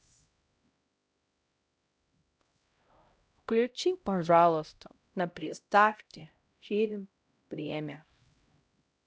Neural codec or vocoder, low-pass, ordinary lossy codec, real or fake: codec, 16 kHz, 0.5 kbps, X-Codec, HuBERT features, trained on LibriSpeech; none; none; fake